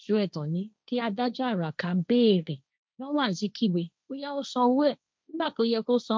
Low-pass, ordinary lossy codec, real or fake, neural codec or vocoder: none; none; fake; codec, 16 kHz, 1.1 kbps, Voila-Tokenizer